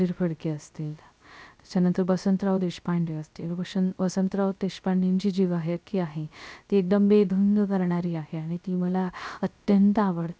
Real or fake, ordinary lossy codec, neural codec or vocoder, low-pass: fake; none; codec, 16 kHz, 0.3 kbps, FocalCodec; none